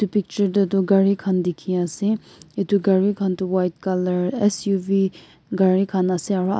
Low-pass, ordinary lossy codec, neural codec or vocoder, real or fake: none; none; none; real